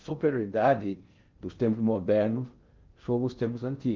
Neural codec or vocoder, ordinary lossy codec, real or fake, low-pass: codec, 16 kHz in and 24 kHz out, 0.6 kbps, FocalCodec, streaming, 4096 codes; Opus, 32 kbps; fake; 7.2 kHz